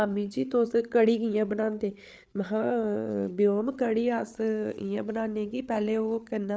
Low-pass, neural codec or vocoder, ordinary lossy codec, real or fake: none; codec, 16 kHz, 8 kbps, FreqCodec, larger model; none; fake